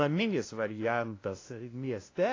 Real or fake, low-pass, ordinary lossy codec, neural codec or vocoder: fake; 7.2 kHz; AAC, 32 kbps; codec, 16 kHz, 0.5 kbps, FunCodec, trained on LibriTTS, 25 frames a second